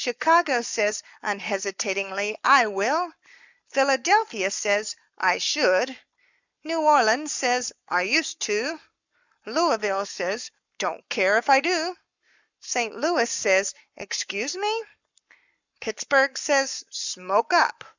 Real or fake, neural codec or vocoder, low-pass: fake; codec, 44.1 kHz, 7.8 kbps, Pupu-Codec; 7.2 kHz